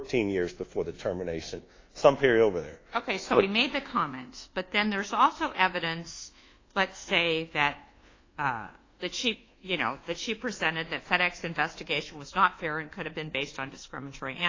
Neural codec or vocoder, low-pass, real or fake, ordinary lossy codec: codec, 24 kHz, 1.2 kbps, DualCodec; 7.2 kHz; fake; AAC, 32 kbps